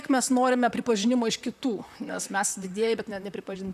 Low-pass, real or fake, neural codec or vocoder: 14.4 kHz; fake; vocoder, 44.1 kHz, 128 mel bands, Pupu-Vocoder